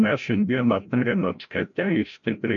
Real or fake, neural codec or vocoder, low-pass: fake; codec, 16 kHz, 0.5 kbps, FreqCodec, larger model; 7.2 kHz